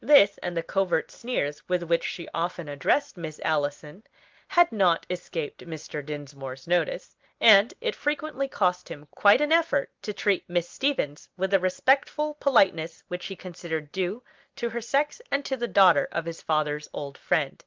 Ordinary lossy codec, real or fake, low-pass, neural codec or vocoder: Opus, 24 kbps; real; 7.2 kHz; none